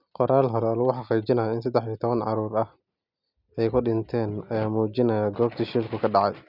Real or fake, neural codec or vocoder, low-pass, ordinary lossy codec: real; none; 5.4 kHz; none